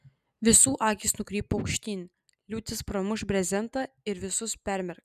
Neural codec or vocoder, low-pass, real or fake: none; 14.4 kHz; real